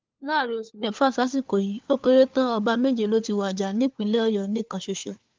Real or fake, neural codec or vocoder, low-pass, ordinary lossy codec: fake; codec, 16 kHz, 4 kbps, FunCodec, trained on LibriTTS, 50 frames a second; 7.2 kHz; Opus, 24 kbps